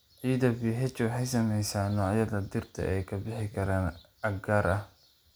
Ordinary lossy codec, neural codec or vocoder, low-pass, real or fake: none; none; none; real